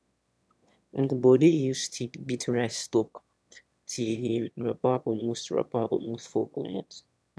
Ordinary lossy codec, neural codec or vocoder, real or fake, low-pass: none; autoencoder, 22.05 kHz, a latent of 192 numbers a frame, VITS, trained on one speaker; fake; none